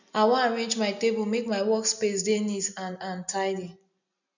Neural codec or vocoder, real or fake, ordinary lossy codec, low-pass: none; real; none; 7.2 kHz